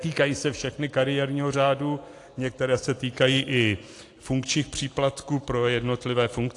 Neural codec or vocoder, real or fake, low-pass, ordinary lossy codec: none; real; 10.8 kHz; AAC, 48 kbps